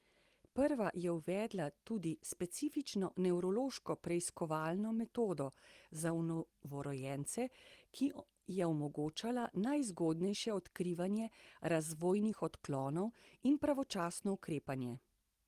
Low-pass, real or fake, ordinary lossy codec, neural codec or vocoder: 14.4 kHz; real; Opus, 24 kbps; none